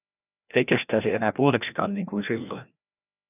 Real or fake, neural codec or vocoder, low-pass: fake; codec, 16 kHz, 1 kbps, FreqCodec, larger model; 3.6 kHz